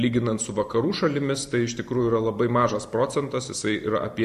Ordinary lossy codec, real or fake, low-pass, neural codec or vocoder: AAC, 96 kbps; fake; 14.4 kHz; vocoder, 44.1 kHz, 128 mel bands every 512 samples, BigVGAN v2